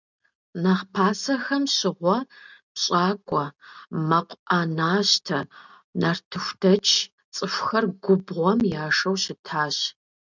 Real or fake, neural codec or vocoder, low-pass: real; none; 7.2 kHz